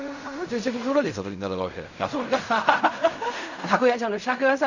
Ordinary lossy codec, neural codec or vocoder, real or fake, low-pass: none; codec, 16 kHz in and 24 kHz out, 0.4 kbps, LongCat-Audio-Codec, fine tuned four codebook decoder; fake; 7.2 kHz